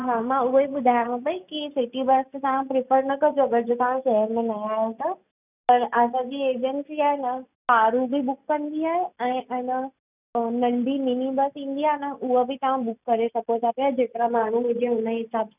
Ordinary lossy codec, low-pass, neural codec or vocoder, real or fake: none; 3.6 kHz; none; real